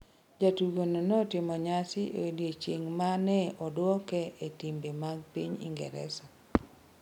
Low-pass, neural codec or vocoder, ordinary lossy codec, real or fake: 19.8 kHz; none; none; real